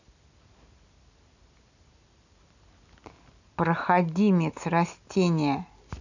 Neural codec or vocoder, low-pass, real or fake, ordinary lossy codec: none; 7.2 kHz; real; none